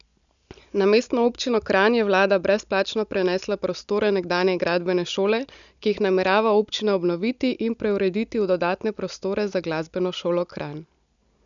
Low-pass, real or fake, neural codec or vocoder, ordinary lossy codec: 7.2 kHz; real; none; none